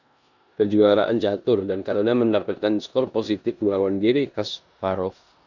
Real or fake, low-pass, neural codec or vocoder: fake; 7.2 kHz; codec, 16 kHz in and 24 kHz out, 0.9 kbps, LongCat-Audio-Codec, four codebook decoder